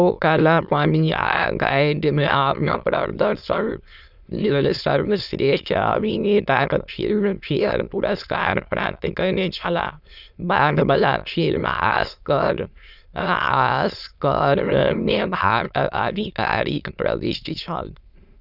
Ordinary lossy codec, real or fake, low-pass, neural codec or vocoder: none; fake; 5.4 kHz; autoencoder, 22.05 kHz, a latent of 192 numbers a frame, VITS, trained on many speakers